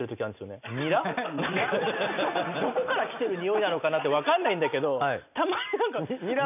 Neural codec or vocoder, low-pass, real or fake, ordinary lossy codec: none; 3.6 kHz; real; none